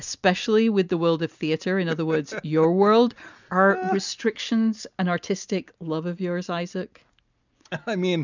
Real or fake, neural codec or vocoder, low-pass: real; none; 7.2 kHz